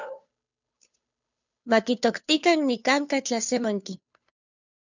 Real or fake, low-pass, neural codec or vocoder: fake; 7.2 kHz; codec, 16 kHz, 2 kbps, FunCodec, trained on Chinese and English, 25 frames a second